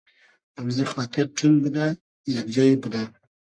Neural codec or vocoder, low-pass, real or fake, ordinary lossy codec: codec, 44.1 kHz, 1.7 kbps, Pupu-Codec; 9.9 kHz; fake; MP3, 64 kbps